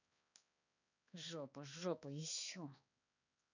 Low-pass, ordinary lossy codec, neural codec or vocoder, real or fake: 7.2 kHz; none; codec, 16 kHz, 2 kbps, X-Codec, HuBERT features, trained on balanced general audio; fake